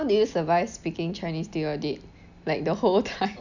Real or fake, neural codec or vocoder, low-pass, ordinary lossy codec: real; none; 7.2 kHz; none